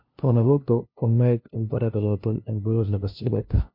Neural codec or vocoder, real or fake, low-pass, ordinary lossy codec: codec, 16 kHz, 0.5 kbps, FunCodec, trained on LibriTTS, 25 frames a second; fake; 5.4 kHz; MP3, 32 kbps